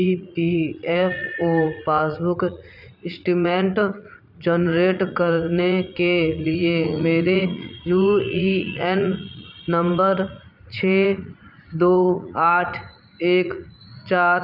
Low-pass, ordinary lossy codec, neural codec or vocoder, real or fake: 5.4 kHz; none; vocoder, 44.1 kHz, 80 mel bands, Vocos; fake